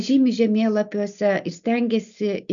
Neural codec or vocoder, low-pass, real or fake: none; 7.2 kHz; real